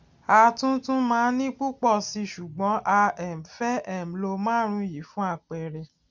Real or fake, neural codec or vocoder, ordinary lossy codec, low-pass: real; none; none; 7.2 kHz